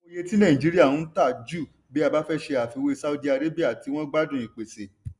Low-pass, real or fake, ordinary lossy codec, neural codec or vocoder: 10.8 kHz; real; none; none